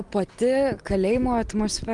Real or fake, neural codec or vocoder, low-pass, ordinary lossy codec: real; none; 10.8 kHz; Opus, 24 kbps